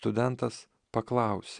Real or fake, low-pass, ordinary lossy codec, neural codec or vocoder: real; 9.9 kHz; MP3, 96 kbps; none